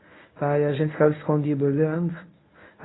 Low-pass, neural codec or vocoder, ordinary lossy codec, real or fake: 7.2 kHz; codec, 24 kHz, 0.9 kbps, WavTokenizer, medium speech release version 1; AAC, 16 kbps; fake